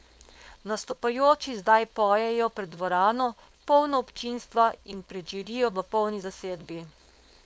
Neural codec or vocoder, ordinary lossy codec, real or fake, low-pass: codec, 16 kHz, 4.8 kbps, FACodec; none; fake; none